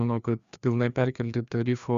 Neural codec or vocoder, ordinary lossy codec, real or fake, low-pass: codec, 16 kHz, 2 kbps, FreqCodec, larger model; Opus, 64 kbps; fake; 7.2 kHz